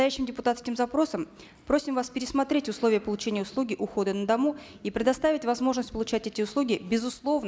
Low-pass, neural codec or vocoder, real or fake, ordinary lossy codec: none; none; real; none